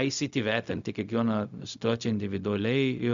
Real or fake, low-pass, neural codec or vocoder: fake; 7.2 kHz; codec, 16 kHz, 0.4 kbps, LongCat-Audio-Codec